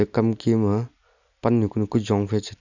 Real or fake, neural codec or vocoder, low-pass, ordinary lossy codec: real; none; 7.2 kHz; none